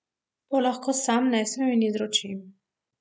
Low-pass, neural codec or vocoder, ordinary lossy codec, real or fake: none; none; none; real